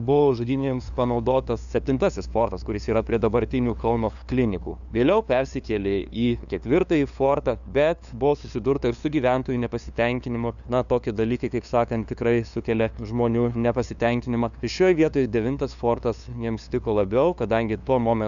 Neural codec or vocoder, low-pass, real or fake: codec, 16 kHz, 2 kbps, FunCodec, trained on LibriTTS, 25 frames a second; 7.2 kHz; fake